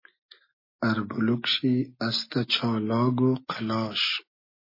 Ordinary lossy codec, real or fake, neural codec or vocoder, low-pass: MP3, 24 kbps; real; none; 5.4 kHz